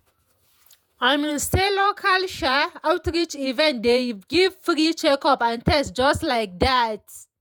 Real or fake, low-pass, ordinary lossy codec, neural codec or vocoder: fake; none; none; vocoder, 48 kHz, 128 mel bands, Vocos